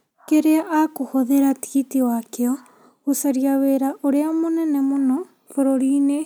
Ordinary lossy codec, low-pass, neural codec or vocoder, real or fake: none; none; none; real